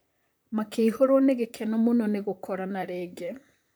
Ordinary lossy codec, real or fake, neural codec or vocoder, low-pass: none; fake; vocoder, 44.1 kHz, 128 mel bands, Pupu-Vocoder; none